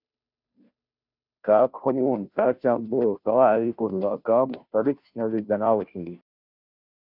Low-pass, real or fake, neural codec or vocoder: 5.4 kHz; fake; codec, 16 kHz, 0.5 kbps, FunCodec, trained on Chinese and English, 25 frames a second